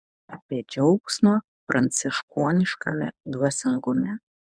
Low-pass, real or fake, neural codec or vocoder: 9.9 kHz; fake; codec, 24 kHz, 0.9 kbps, WavTokenizer, medium speech release version 1